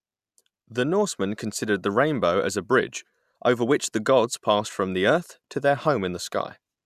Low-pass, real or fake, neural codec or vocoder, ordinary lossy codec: 14.4 kHz; real; none; none